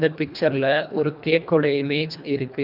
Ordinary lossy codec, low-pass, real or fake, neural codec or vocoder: none; 5.4 kHz; fake; codec, 24 kHz, 1.5 kbps, HILCodec